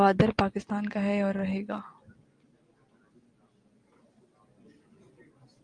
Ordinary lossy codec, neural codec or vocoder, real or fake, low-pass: Opus, 32 kbps; none; real; 9.9 kHz